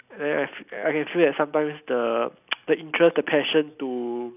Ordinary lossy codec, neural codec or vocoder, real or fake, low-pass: none; none; real; 3.6 kHz